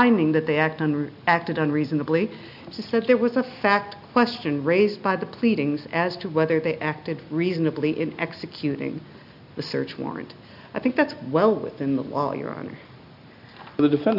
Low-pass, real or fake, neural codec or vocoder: 5.4 kHz; real; none